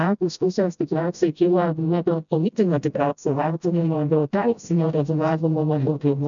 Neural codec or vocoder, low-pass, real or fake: codec, 16 kHz, 0.5 kbps, FreqCodec, smaller model; 7.2 kHz; fake